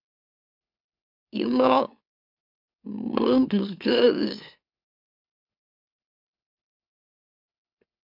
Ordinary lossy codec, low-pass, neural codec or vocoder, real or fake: MP3, 48 kbps; 5.4 kHz; autoencoder, 44.1 kHz, a latent of 192 numbers a frame, MeloTTS; fake